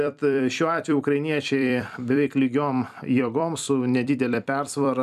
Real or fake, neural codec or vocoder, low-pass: fake; vocoder, 44.1 kHz, 128 mel bands every 256 samples, BigVGAN v2; 14.4 kHz